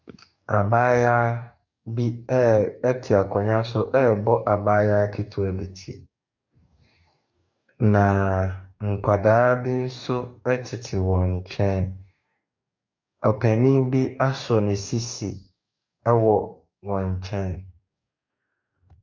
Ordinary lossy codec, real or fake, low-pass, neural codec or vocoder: MP3, 64 kbps; fake; 7.2 kHz; codec, 44.1 kHz, 2.6 kbps, DAC